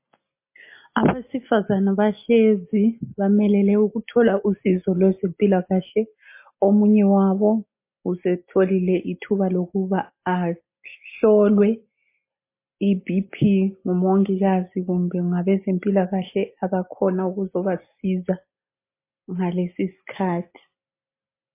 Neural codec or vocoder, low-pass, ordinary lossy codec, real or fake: none; 3.6 kHz; MP3, 24 kbps; real